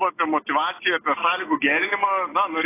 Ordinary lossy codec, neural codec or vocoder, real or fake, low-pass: AAC, 16 kbps; none; real; 3.6 kHz